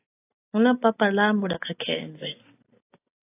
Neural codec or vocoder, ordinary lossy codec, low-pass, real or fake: none; AAC, 24 kbps; 3.6 kHz; real